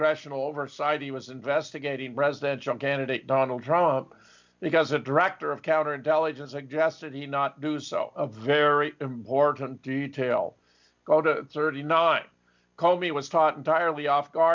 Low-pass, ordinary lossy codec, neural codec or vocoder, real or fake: 7.2 kHz; MP3, 64 kbps; none; real